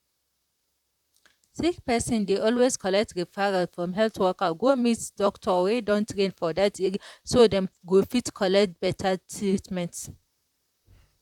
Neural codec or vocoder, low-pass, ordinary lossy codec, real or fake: vocoder, 44.1 kHz, 128 mel bands, Pupu-Vocoder; 19.8 kHz; none; fake